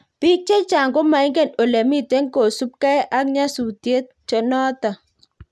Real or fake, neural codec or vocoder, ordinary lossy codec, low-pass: real; none; none; none